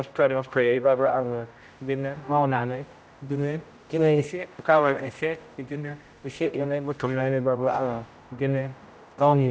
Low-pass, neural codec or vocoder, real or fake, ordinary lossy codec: none; codec, 16 kHz, 0.5 kbps, X-Codec, HuBERT features, trained on general audio; fake; none